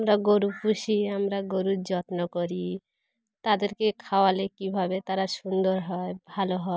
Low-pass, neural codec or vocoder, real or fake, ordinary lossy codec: none; none; real; none